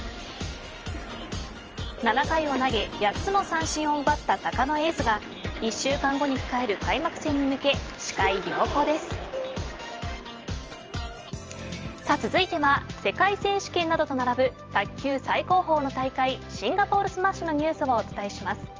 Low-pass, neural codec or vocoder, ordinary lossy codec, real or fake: 7.2 kHz; vocoder, 44.1 kHz, 128 mel bands every 512 samples, BigVGAN v2; Opus, 24 kbps; fake